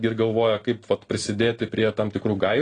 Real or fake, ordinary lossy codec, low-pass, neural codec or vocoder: real; AAC, 32 kbps; 9.9 kHz; none